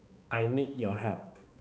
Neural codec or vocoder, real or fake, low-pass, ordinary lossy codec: codec, 16 kHz, 2 kbps, X-Codec, HuBERT features, trained on balanced general audio; fake; none; none